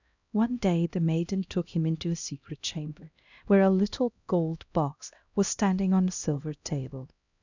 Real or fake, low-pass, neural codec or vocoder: fake; 7.2 kHz; codec, 16 kHz, 1 kbps, X-Codec, HuBERT features, trained on LibriSpeech